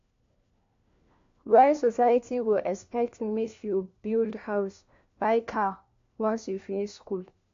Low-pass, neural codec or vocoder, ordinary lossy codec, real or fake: 7.2 kHz; codec, 16 kHz, 1 kbps, FunCodec, trained on LibriTTS, 50 frames a second; MP3, 48 kbps; fake